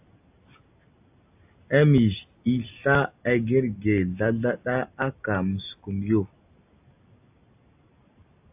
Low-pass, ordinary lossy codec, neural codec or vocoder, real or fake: 3.6 kHz; AAC, 32 kbps; none; real